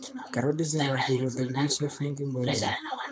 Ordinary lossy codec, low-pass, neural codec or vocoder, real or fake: none; none; codec, 16 kHz, 4.8 kbps, FACodec; fake